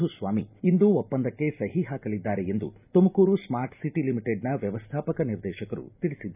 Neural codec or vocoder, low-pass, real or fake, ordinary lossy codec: none; 3.6 kHz; real; none